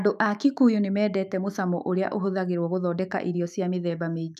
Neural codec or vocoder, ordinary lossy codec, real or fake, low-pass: autoencoder, 48 kHz, 128 numbers a frame, DAC-VAE, trained on Japanese speech; none; fake; 14.4 kHz